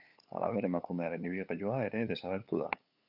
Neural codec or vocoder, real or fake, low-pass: codec, 16 kHz, 8 kbps, FunCodec, trained on LibriTTS, 25 frames a second; fake; 5.4 kHz